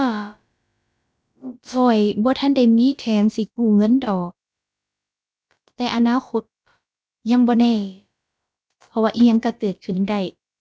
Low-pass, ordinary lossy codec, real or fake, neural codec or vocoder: none; none; fake; codec, 16 kHz, about 1 kbps, DyCAST, with the encoder's durations